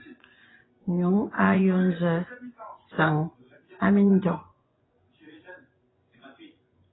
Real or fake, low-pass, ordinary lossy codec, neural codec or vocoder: fake; 7.2 kHz; AAC, 16 kbps; vocoder, 24 kHz, 100 mel bands, Vocos